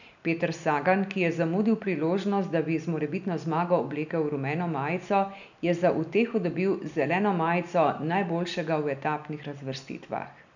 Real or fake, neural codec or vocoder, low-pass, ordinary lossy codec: real; none; 7.2 kHz; none